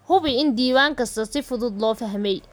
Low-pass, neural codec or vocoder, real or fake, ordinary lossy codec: none; none; real; none